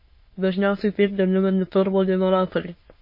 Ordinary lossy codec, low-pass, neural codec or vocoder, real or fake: MP3, 24 kbps; 5.4 kHz; autoencoder, 22.05 kHz, a latent of 192 numbers a frame, VITS, trained on many speakers; fake